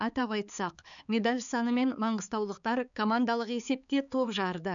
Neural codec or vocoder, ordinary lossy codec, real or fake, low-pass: codec, 16 kHz, 4 kbps, X-Codec, HuBERT features, trained on balanced general audio; none; fake; 7.2 kHz